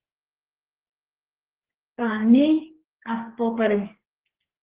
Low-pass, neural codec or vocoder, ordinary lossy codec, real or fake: 3.6 kHz; codec, 44.1 kHz, 2.6 kbps, DAC; Opus, 16 kbps; fake